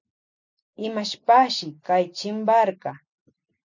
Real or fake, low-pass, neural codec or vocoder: real; 7.2 kHz; none